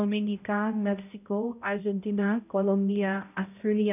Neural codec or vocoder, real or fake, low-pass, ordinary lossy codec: codec, 16 kHz, 0.5 kbps, X-Codec, HuBERT features, trained on balanced general audio; fake; 3.6 kHz; none